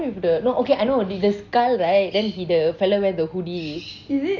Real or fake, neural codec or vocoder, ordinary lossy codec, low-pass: real; none; none; 7.2 kHz